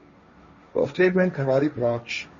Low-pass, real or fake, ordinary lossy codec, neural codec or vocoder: 7.2 kHz; fake; MP3, 32 kbps; codec, 16 kHz, 1.1 kbps, Voila-Tokenizer